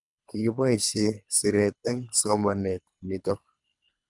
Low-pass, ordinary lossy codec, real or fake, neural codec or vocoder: 10.8 kHz; none; fake; codec, 24 kHz, 3 kbps, HILCodec